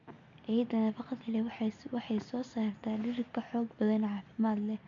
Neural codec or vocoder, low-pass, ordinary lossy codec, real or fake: none; 7.2 kHz; MP3, 64 kbps; real